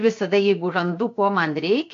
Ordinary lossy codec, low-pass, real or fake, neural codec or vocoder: AAC, 48 kbps; 7.2 kHz; fake; codec, 16 kHz, 0.7 kbps, FocalCodec